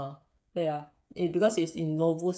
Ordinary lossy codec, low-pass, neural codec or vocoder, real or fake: none; none; codec, 16 kHz, 8 kbps, FreqCodec, smaller model; fake